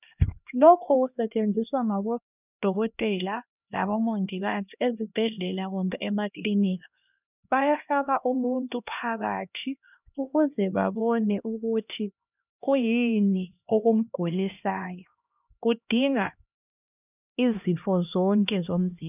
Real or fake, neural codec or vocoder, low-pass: fake; codec, 16 kHz, 1 kbps, X-Codec, HuBERT features, trained on LibriSpeech; 3.6 kHz